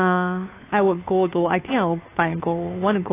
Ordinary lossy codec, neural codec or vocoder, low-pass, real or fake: AAC, 24 kbps; codec, 16 kHz, 2 kbps, FunCodec, trained on Chinese and English, 25 frames a second; 3.6 kHz; fake